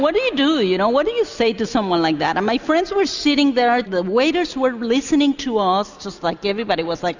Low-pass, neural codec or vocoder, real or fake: 7.2 kHz; none; real